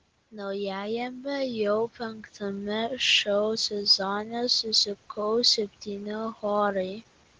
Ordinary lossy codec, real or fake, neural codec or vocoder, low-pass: Opus, 16 kbps; real; none; 7.2 kHz